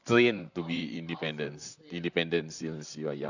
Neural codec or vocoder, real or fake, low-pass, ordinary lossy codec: vocoder, 44.1 kHz, 128 mel bands, Pupu-Vocoder; fake; 7.2 kHz; none